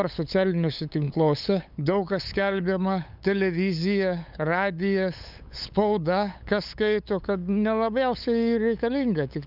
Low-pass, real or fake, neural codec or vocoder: 5.4 kHz; fake; codec, 16 kHz, 8 kbps, FunCodec, trained on LibriTTS, 25 frames a second